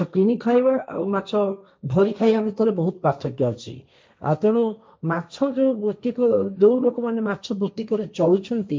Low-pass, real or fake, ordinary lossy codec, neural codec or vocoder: none; fake; none; codec, 16 kHz, 1.1 kbps, Voila-Tokenizer